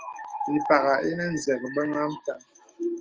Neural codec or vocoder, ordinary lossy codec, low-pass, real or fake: autoencoder, 48 kHz, 128 numbers a frame, DAC-VAE, trained on Japanese speech; Opus, 24 kbps; 7.2 kHz; fake